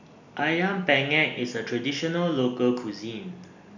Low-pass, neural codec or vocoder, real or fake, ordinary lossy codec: 7.2 kHz; none; real; none